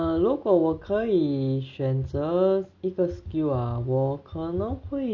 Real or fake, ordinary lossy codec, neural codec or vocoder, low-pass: real; none; none; 7.2 kHz